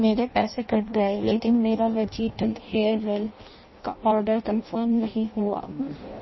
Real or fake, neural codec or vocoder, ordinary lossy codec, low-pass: fake; codec, 16 kHz in and 24 kHz out, 0.6 kbps, FireRedTTS-2 codec; MP3, 24 kbps; 7.2 kHz